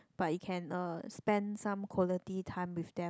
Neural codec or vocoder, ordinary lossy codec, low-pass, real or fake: none; none; none; real